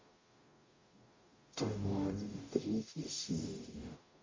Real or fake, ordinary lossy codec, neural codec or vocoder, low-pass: fake; MP3, 32 kbps; codec, 44.1 kHz, 0.9 kbps, DAC; 7.2 kHz